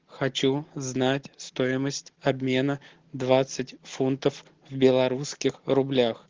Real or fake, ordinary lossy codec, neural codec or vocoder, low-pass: real; Opus, 16 kbps; none; 7.2 kHz